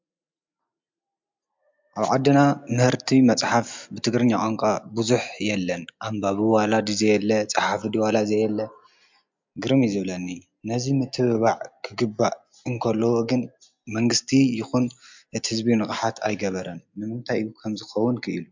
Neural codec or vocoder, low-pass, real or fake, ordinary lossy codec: none; 7.2 kHz; real; MP3, 64 kbps